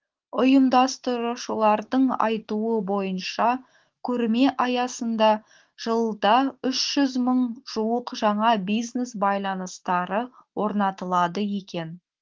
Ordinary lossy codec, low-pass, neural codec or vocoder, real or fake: Opus, 16 kbps; 7.2 kHz; autoencoder, 48 kHz, 128 numbers a frame, DAC-VAE, trained on Japanese speech; fake